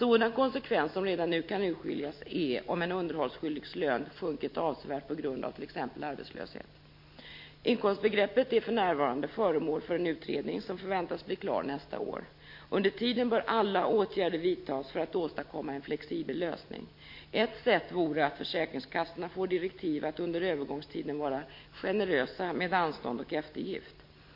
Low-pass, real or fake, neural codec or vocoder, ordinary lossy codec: 5.4 kHz; real; none; MP3, 32 kbps